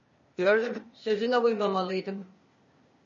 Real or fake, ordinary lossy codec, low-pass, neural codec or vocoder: fake; MP3, 32 kbps; 7.2 kHz; codec, 16 kHz, 0.8 kbps, ZipCodec